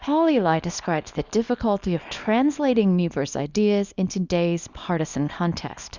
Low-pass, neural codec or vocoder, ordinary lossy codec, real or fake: 7.2 kHz; codec, 24 kHz, 0.9 kbps, WavTokenizer, small release; Opus, 64 kbps; fake